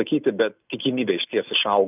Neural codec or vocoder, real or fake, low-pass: none; real; 3.6 kHz